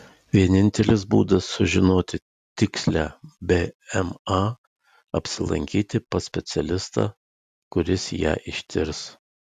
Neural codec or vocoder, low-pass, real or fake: none; 14.4 kHz; real